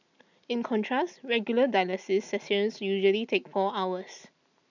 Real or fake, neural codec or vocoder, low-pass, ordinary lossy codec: real; none; 7.2 kHz; none